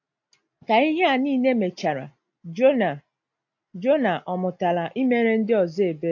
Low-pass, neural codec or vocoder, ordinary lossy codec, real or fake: 7.2 kHz; none; AAC, 48 kbps; real